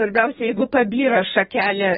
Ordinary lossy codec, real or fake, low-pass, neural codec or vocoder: AAC, 16 kbps; fake; 14.4 kHz; codec, 32 kHz, 1.9 kbps, SNAC